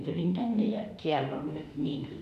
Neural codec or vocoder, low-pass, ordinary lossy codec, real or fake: autoencoder, 48 kHz, 32 numbers a frame, DAC-VAE, trained on Japanese speech; 14.4 kHz; none; fake